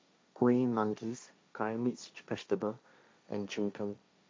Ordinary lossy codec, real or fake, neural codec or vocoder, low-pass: none; fake; codec, 16 kHz, 1.1 kbps, Voila-Tokenizer; none